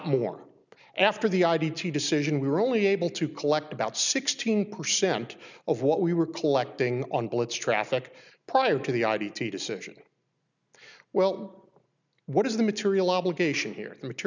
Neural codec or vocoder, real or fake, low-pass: none; real; 7.2 kHz